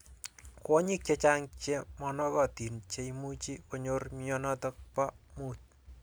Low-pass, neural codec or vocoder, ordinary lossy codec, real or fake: none; none; none; real